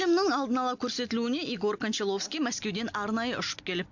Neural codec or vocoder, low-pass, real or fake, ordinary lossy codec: none; 7.2 kHz; real; none